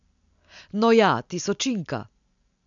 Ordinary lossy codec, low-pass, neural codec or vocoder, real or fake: MP3, 96 kbps; 7.2 kHz; none; real